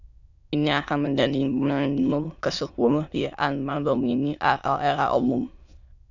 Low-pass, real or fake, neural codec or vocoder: 7.2 kHz; fake; autoencoder, 22.05 kHz, a latent of 192 numbers a frame, VITS, trained on many speakers